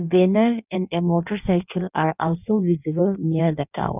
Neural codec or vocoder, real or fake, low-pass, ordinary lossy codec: codec, 16 kHz in and 24 kHz out, 1.1 kbps, FireRedTTS-2 codec; fake; 3.6 kHz; none